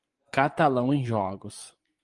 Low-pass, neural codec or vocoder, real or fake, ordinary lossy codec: 10.8 kHz; vocoder, 24 kHz, 100 mel bands, Vocos; fake; Opus, 24 kbps